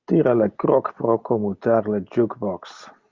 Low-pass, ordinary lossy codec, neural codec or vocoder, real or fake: 7.2 kHz; Opus, 24 kbps; none; real